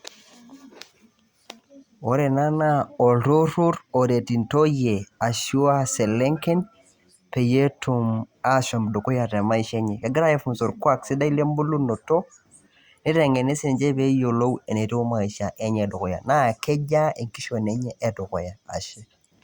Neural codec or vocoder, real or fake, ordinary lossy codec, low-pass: none; real; Opus, 64 kbps; 19.8 kHz